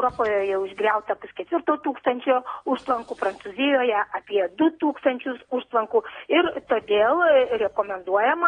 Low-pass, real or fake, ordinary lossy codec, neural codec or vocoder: 9.9 kHz; real; AAC, 32 kbps; none